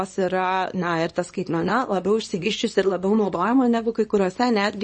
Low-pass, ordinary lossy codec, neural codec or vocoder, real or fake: 10.8 kHz; MP3, 32 kbps; codec, 24 kHz, 0.9 kbps, WavTokenizer, small release; fake